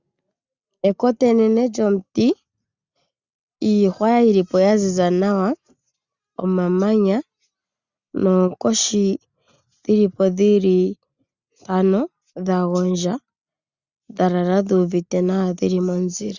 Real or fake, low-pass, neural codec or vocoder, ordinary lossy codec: real; 7.2 kHz; none; Opus, 64 kbps